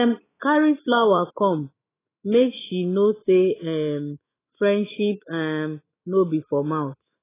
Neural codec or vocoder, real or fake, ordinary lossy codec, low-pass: autoencoder, 48 kHz, 128 numbers a frame, DAC-VAE, trained on Japanese speech; fake; AAC, 16 kbps; 3.6 kHz